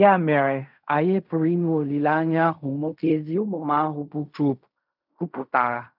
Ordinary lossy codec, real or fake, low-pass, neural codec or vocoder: none; fake; 5.4 kHz; codec, 16 kHz in and 24 kHz out, 0.4 kbps, LongCat-Audio-Codec, fine tuned four codebook decoder